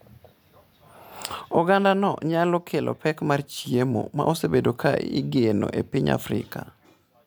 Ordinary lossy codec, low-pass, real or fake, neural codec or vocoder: none; none; real; none